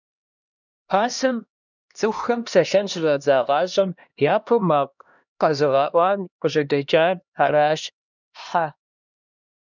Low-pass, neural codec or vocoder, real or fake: 7.2 kHz; codec, 16 kHz, 1 kbps, X-Codec, HuBERT features, trained on LibriSpeech; fake